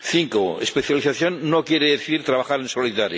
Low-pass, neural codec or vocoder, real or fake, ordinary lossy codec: none; none; real; none